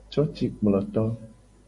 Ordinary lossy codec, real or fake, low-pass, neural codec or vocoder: MP3, 48 kbps; real; 10.8 kHz; none